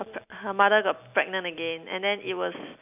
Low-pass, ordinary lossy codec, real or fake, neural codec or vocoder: 3.6 kHz; none; real; none